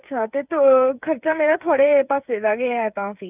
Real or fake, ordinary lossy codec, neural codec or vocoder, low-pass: fake; none; codec, 16 kHz, 8 kbps, FreqCodec, smaller model; 3.6 kHz